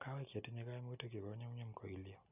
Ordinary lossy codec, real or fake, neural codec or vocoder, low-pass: none; real; none; 3.6 kHz